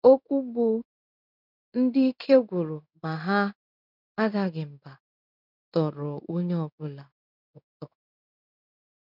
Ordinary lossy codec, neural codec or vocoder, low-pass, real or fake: none; codec, 16 kHz in and 24 kHz out, 1 kbps, XY-Tokenizer; 5.4 kHz; fake